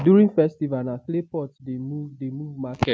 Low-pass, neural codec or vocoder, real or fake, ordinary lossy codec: none; none; real; none